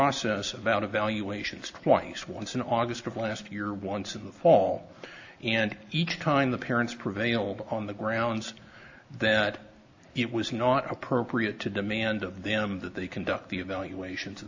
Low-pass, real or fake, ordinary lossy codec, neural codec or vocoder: 7.2 kHz; real; AAC, 48 kbps; none